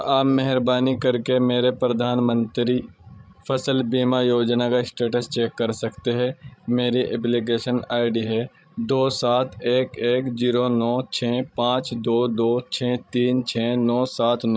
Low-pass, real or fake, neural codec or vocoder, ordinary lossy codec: none; fake; codec, 16 kHz, 16 kbps, FreqCodec, larger model; none